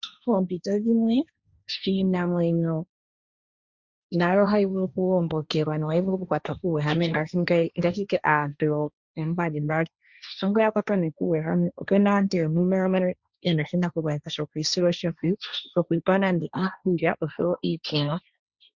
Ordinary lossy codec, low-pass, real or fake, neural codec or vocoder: Opus, 64 kbps; 7.2 kHz; fake; codec, 16 kHz, 1.1 kbps, Voila-Tokenizer